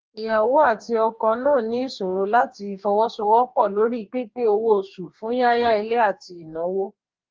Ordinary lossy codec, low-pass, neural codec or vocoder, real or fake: Opus, 32 kbps; 7.2 kHz; codec, 44.1 kHz, 2.6 kbps, DAC; fake